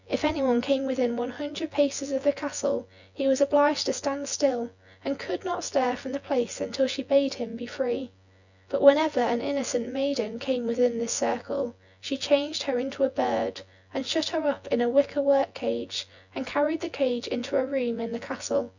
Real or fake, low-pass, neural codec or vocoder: fake; 7.2 kHz; vocoder, 24 kHz, 100 mel bands, Vocos